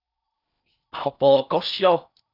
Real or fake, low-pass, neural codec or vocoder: fake; 5.4 kHz; codec, 16 kHz in and 24 kHz out, 0.6 kbps, FocalCodec, streaming, 4096 codes